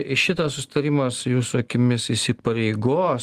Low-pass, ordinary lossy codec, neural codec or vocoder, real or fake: 14.4 kHz; Opus, 24 kbps; none; real